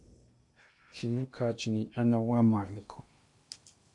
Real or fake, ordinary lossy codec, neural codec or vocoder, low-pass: fake; MP3, 64 kbps; codec, 16 kHz in and 24 kHz out, 0.8 kbps, FocalCodec, streaming, 65536 codes; 10.8 kHz